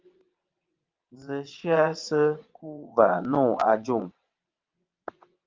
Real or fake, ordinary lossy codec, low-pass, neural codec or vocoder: fake; Opus, 24 kbps; 7.2 kHz; vocoder, 22.05 kHz, 80 mel bands, WaveNeXt